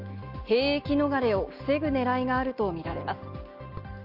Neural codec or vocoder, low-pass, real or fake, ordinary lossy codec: none; 5.4 kHz; real; Opus, 24 kbps